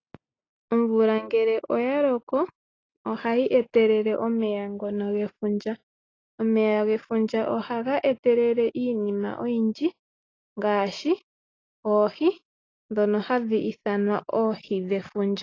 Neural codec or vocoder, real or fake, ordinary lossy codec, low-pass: none; real; AAC, 32 kbps; 7.2 kHz